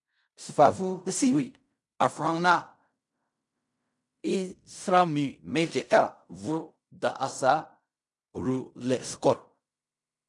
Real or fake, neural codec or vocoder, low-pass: fake; codec, 16 kHz in and 24 kHz out, 0.4 kbps, LongCat-Audio-Codec, fine tuned four codebook decoder; 10.8 kHz